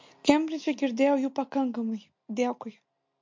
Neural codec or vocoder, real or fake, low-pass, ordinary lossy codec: none; real; 7.2 kHz; MP3, 48 kbps